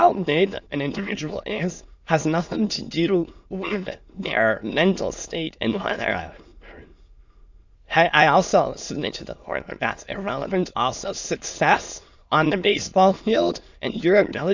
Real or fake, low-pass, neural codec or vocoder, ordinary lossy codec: fake; 7.2 kHz; autoencoder, 22.05 kHz, a latent of 192 numbers a frame, VITS, trained on many speakers; Opus, 64 kbps